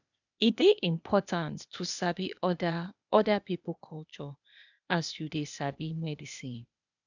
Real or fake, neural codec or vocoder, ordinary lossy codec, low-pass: fake; codec, 16 kHz, 0.8 kbps, ZipCodec; none; 7.2 kHz